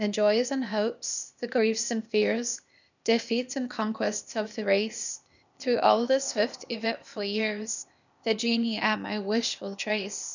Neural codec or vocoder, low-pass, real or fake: codec, 16 kHz, 0.8 kbps, ZipCodec; 7.2 kHz; fake